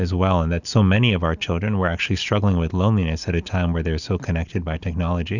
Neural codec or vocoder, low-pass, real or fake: none; 7.2 kHz; real